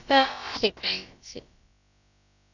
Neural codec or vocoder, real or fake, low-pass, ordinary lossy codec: codec, 16 kHz, about 1 kbps, DyCAST, with the encoder's durations; fake; 7.2 kHz; none